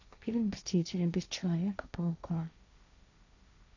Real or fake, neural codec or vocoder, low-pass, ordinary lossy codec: fake; codec, 16 kHz, 1.1 kbps, Voila-Tokenizer; 7.2 kHz; none